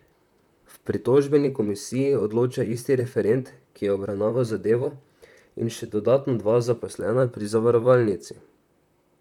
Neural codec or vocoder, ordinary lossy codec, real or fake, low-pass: vocoder, 44.1 kHz, 128 mel bands, Pupu-Vocoder; none; fake; 19.8 kHz